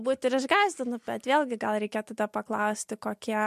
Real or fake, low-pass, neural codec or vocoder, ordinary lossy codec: real; 14.4 kHz; none; MP3, 64 kbps